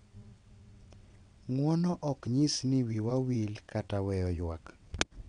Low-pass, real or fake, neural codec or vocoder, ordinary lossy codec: 9.9 kHz; fake; vocoder, 22.05 kHz, 80 mel bands, WaveNeXt; none